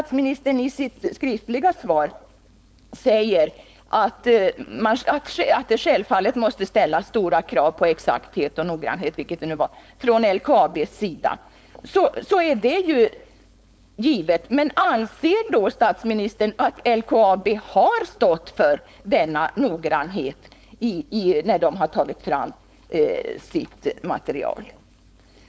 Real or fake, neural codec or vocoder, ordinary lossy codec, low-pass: fake; codec, 16 kHz, 4.8 kbps, FACodec; none; none